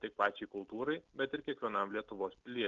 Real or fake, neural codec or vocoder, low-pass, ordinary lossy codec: real; none; 7.2 kHz; Opus, 24 kbps